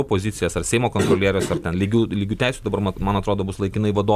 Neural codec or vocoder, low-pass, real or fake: none; 14.4 kHz; real